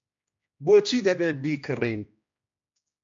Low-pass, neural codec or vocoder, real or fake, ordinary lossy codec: 7.2 kHz; codec, 16 kHz, 1 kbps, X-Codec, HuBERT features, trained on general audio; fake; MP3, 48 kbps